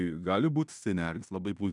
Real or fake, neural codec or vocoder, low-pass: fake; codec, 16 kHz in and 24 kHz out, 0.9 kbps, LongCat-Audio-Codec, fine tuned four codebook decoder; 10.8 kHz